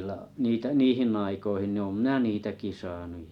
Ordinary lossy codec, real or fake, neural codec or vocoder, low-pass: none; real; none; 19.8 kHz